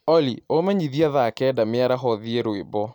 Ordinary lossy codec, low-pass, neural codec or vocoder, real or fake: none; 19.8 kHz; none; real